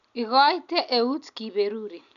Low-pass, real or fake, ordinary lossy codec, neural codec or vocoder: 7.2 kHz; real; none; none